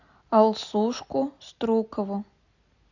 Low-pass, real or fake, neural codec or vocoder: 7.2 kHz; real; none